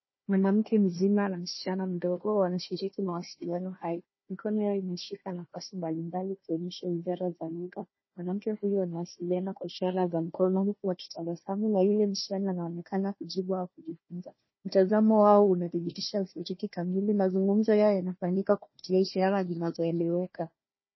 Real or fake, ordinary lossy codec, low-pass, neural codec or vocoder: fake; MP3, 24 kbps; 7.2 kHz; codec, 16 kHz, 1 kbps, FunCodec, trained on Chinese and English, 50 frames a second